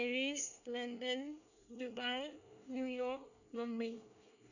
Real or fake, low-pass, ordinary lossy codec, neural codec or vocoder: fake; 7.2 kHz; none; codec, 24 kHz, 1 kbps, SNAC